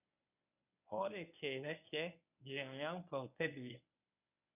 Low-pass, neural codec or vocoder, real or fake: 3.6 kHz; codec, 24 kHz, 0.9 kbps, WavTokenizer, medium speech release version 1; fake